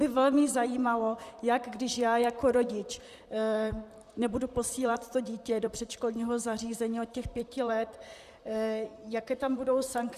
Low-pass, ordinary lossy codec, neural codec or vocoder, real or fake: 14.4 kHz; Opus, 64 kbps; vocoder, 44.1 kHz, 128 mel bands, Pupu-Vocoder; fake